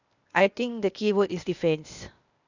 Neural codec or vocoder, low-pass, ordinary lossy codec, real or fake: codec, 16 kHz, 0.8 kbps, ZipCodec; 7.2 kHz; none; fake